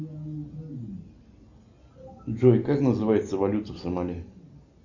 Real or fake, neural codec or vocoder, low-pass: real; none; 7.2 kHz